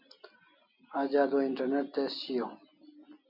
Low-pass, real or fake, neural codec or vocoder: 5.4 kHz; real; none